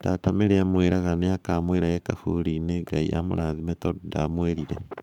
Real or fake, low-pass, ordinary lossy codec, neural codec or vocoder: fake; 19.8 kHz; none; codec, 44.1 kHz, 7.8 kbps, Pupu-Codec